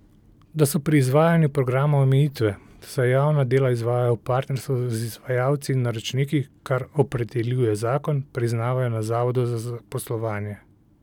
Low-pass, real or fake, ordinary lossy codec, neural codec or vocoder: 19.8 kHz; real; none; none